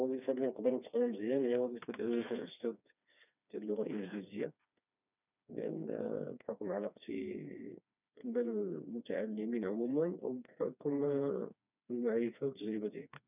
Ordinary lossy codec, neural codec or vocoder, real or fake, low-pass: none; codec, 16 kHz, 2 kbps, FreqCodec, smaller model; fake; 3.6 kHz